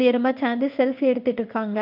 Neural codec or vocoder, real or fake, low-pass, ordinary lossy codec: codec, 16 kHz in and 24 kHz out, 1 kbps, XY-Tokenizer; fake; 5.4 kHz; none